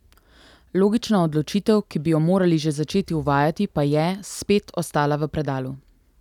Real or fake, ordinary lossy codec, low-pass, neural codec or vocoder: real; none; 19.8 kHz; none